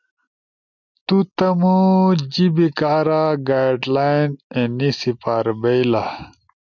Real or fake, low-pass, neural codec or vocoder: real; 7.2 kHz; none